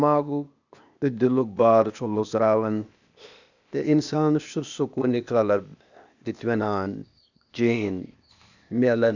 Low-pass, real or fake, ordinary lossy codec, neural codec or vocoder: 7.2 kHz; fake; none; codec, 16 kHz, 0.8 kbps, ZipCodec